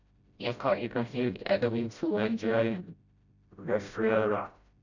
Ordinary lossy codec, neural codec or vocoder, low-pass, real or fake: none; codec, 16 kHz, 0.5 kbps, FreqCodec, smaller model; 7.2 kHz; fake